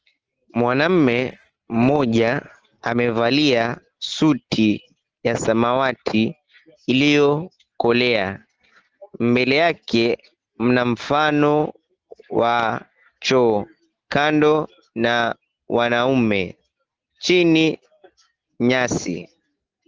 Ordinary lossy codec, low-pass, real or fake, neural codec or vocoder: Opus, 16 kbps; 7.2 kHz; real; none